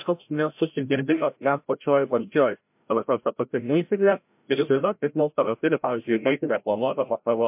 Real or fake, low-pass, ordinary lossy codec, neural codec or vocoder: fake; 3.6 kHz; MP3, 32 kbps; codec, 16 kHz, 0.5 kbps, FreqCodec, larger model